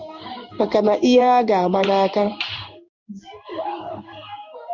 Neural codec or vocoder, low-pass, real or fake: codec, 16 kHz in and 24 kHz out, 1 kbps, XY-Tokenizer; 7.2 kHz; fake